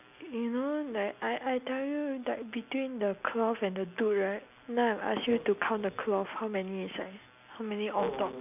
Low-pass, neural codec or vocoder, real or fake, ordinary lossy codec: 3.6 kHz; none; real; none